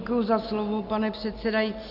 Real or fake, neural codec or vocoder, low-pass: real; none; 5.4 kHz